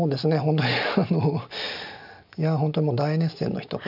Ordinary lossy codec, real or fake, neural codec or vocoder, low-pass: none; real; none; 5.4 kHz